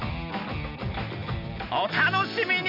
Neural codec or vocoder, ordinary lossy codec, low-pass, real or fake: none; none; 5.4 kHz; real